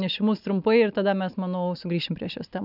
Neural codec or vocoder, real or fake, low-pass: none; real; 5.4 kHz